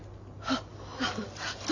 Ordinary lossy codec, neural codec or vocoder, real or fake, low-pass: Opus, 64 kbps; none; real; 7.2 kHz